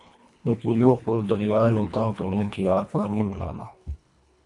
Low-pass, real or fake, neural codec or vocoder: 10.8 kHz; fake; codec, 24 kHz, 1.5 kbps, HILCodec